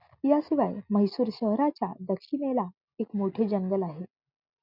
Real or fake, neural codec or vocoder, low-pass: real; none; 5.4 kHz